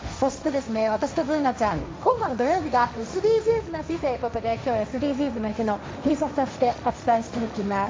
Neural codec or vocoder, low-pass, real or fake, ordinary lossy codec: codec, 16 kHz, 1.1 kbps, Voila-Tokenizer; none; fake; none